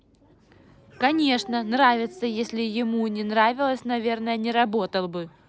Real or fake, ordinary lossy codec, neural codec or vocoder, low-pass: real; none; none; none